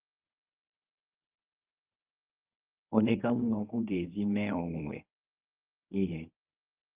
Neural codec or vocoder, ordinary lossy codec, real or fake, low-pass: codec, 24 kHz, 0.9 kbps, WavTokenizer, medium speech release version 1; Opus, 24 kbps; fake; 3.6 kHz